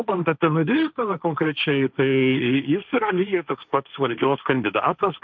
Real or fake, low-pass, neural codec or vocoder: fake; 7.2 kHz; codec, 16 kHz, 1.1 kbps, Voila-Tokenizer